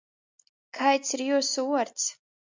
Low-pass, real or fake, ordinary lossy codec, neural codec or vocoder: 7.2 kHz; real; MP3, 64 kbps; none